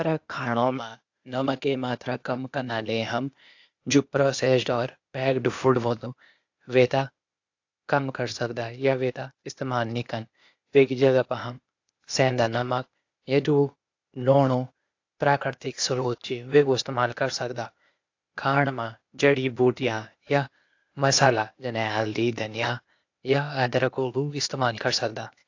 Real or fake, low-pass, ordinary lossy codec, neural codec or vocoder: fake; 7.2 kHz; AAC, 48 kbps; codec, 16 kHz, 0.8 kbps, ZipCodec